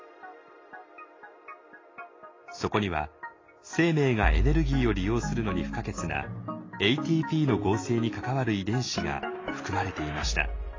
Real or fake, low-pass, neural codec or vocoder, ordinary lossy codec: real; 7.2 kHz; none; AAC, 32 kbps